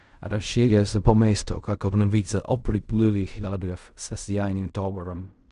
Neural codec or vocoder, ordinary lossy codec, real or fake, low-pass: codec, 16 kHz in and 24 kHz out, 0.4 kbps, LongCat-Audio-Codec, fine tuned four codebook decoder; none; fake; 10.8 kHz